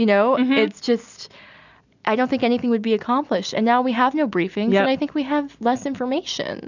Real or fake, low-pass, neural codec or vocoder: real; 7.2 kHz; none